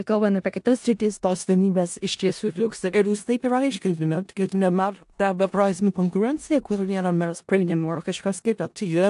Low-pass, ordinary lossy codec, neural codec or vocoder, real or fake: 10.8 kHz; AAC, 64 kbps; codec, 16 kHz in and 24 kHz out, 0.4 kbps, LongCat-Audio-Codec, four codebook decoder; fake